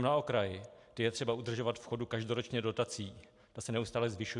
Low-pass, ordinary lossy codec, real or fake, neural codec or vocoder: 10.8 kHz; AAC, 64 kbps; real; none